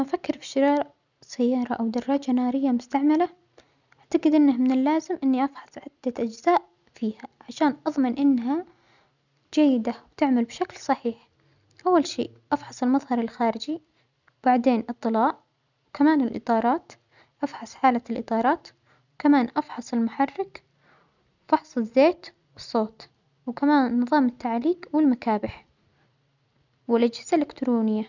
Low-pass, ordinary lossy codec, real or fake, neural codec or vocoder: 7.2 kHz; none; real; none